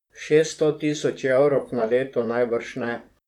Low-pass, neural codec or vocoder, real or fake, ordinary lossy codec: 19.8 kHz; vocoder, 44.1 kHz, 128 mel bands, Pupu-Vocoder; fake; none